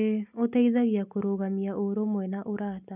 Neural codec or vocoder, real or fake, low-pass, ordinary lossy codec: none; real; 3.6 kHz; none